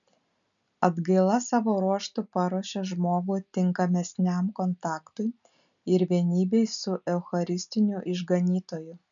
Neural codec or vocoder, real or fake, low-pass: none; real; 7.2 kHz